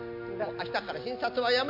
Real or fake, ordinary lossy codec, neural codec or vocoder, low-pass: real; MP3, 48 kbps; none; 5.4 kHz